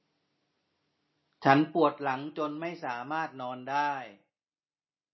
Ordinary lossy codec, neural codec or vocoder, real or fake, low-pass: MP3, 24 kbps; none; real; 7.2 kHz